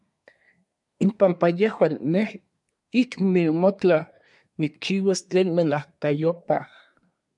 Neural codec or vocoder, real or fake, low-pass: codec, 24 kHz, 1 kbps, SNAC; fake; 10.8 kHz